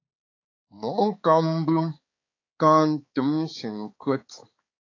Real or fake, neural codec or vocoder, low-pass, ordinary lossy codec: fake; codec, 16 kHz, 4 kbps, X-Codec, HuBERT features, trained on balanced general audio; 7.2 kHz; AAC, 32 kbps